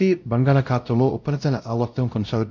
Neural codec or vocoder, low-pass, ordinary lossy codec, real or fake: codec, 16 kHz, 0.5 kbps, X-Codec, WavLM features, trained on Multilingual LibriSpeech; 7.2 kHz; MP3, 64 kbps; fake